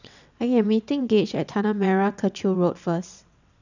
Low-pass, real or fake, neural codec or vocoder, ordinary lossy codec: 7.2 kHz; fake; vocoder, 22.05 kHz, 80 mel bands, WaveNeXt; none